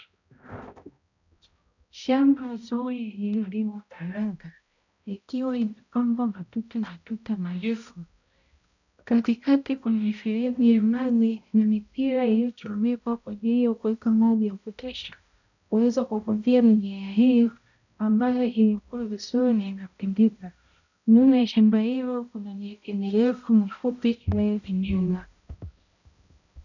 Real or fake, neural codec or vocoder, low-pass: fake; codec, 16 kHz, 0.5 kbps, X-Codec, HuBERT features, trained on balanced general audio; 7.2 kHz